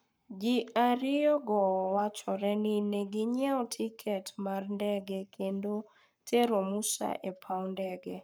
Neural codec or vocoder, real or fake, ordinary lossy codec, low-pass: codec, 44.1 kHz, 7.8 kbps, Pupu-Codec; fake; none; none